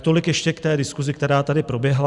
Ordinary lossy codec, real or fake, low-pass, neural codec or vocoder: Opus, 64 kbps; real; 10.8 kHz; none